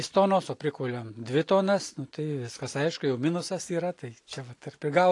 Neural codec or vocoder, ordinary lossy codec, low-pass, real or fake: none; AAC, 48 kbps; 10.8 kHz; real